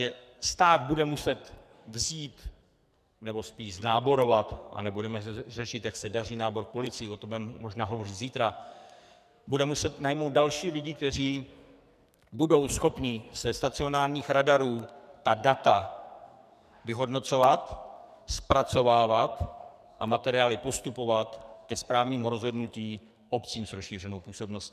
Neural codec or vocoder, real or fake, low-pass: codec, 44.1 kHz, 2.6 kbps, SNAC; fake; 14.4 kHz